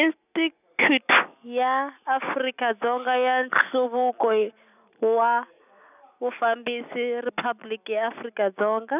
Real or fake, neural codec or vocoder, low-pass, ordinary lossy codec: real; none; 3.6 kHz; none